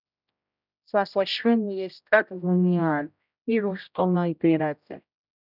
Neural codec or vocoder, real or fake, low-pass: codec, 16 kHz, 0.5 kbps, X-Codec, HuBERT features, trained on general audio; fake; 5.4 kHz